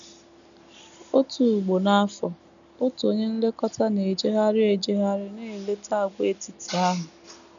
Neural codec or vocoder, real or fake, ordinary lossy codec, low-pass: none; real; none; 7.2 kHz